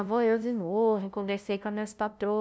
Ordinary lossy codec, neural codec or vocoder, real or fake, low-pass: none; codec, 16 kHz, 0.5 kbps, FunCodec, trained on LibriTTS, 25 frames a second; fake; none